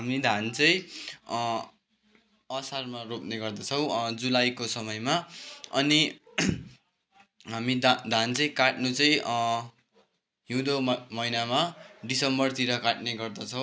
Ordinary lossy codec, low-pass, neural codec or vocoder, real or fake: none; none; none; real